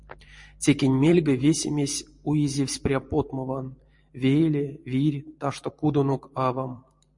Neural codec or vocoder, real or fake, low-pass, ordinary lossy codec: vocoder, 44.1 kHz, 128 mel bands every 512 samples, BigVGAN v2; fake; 10.8 kHz; MP3, 48 kbps